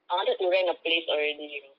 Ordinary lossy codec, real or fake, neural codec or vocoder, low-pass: Opus, 24 kbps; real; none; 5.4 kHz